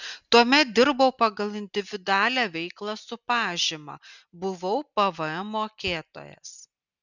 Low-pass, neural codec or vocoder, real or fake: 7.2 kHz; none; real